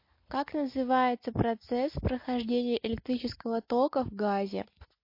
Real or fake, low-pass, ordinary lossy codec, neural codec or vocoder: real; 5.4 kHz; MP3, 32 kbps; none